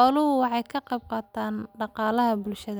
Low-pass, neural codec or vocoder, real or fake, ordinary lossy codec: none; none; real; none